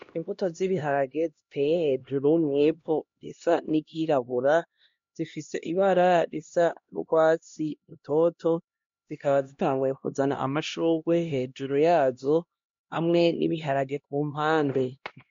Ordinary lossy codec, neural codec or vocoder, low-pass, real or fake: MP3, 48 kbps; codec, 16 kHz, 1 kbps, X-Codec, HuBERT features, trained on LibriSpeech; 7.2 kHz; fake